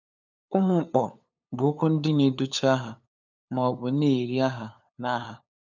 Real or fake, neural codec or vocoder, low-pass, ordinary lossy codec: fake; codec, 16 kHz, 8 kbps, FunCodec, trained on LibriTTS, 25 frames a second; 7.2 kHz; none